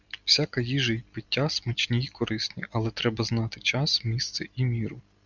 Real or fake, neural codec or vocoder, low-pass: real; none; 7.2 kHz